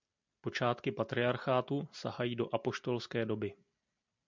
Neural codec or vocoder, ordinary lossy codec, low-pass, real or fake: none; MP3, 64 kbps; 7.2 kHz; real